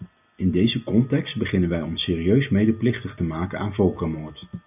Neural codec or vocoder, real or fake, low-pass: none; real; 3.6 kHz